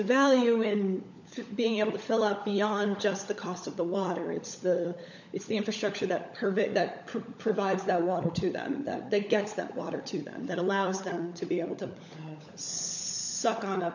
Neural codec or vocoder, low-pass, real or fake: codec, 16 kHz, 16 kbps, FunCodec, trained on LibriTTS, 50 frames a second; 7.2 kHz; fake